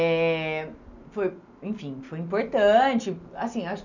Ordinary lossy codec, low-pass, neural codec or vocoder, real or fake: none; 7.2 kHz; none; real